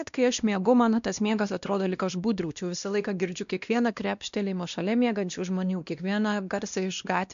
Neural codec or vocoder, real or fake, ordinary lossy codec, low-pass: codec, 16 kHz, 1 kbps, X-Codec, WavLM features, trained on Multilingual LibriSpeech; fake; AAC, 96 kbps; 7.2 kHz